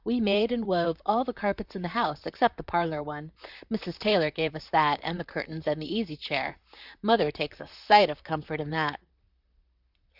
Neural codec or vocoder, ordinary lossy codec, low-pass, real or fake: vocoder, 44.1 kHz, 128 mel bands, Pupu-Vocoder; Opus, 64 kbps; 5.4 kHz; fake